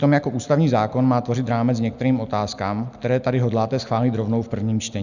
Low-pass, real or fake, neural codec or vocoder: 7.2 kHz; real; none